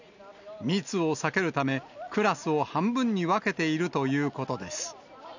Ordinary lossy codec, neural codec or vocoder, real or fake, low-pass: none; none; real; 7.2 kHz